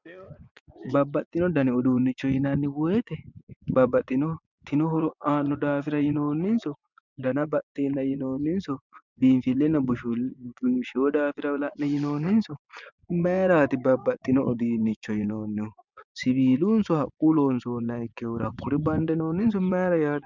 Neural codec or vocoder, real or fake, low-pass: vocoder, 24 kHz, 100 mel bands, Vocos; fake; 7.2 kHz